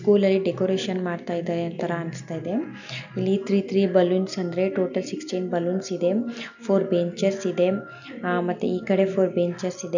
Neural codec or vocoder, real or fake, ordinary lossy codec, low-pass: none; real; none; 7.2 kHz